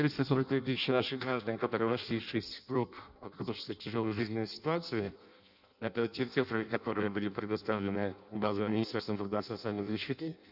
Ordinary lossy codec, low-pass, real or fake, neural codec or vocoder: none; 5.4 kHz; fake; codec, 16 kHz in and 24 kHz out, 0.6 kbps, FireRedTTS-2 codec